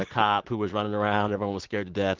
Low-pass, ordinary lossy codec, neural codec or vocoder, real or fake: 7.2 kHz; Opus, 16 kbps; none; real